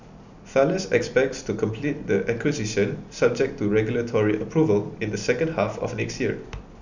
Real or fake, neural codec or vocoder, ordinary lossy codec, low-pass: real; none; none; 7.2 kHz